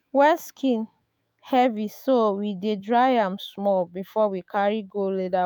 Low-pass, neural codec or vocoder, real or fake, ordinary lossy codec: none; autoencoder, 48 kHz, 128 numbers a frame, DAC-VAE, trained on Japanese speech; fake; none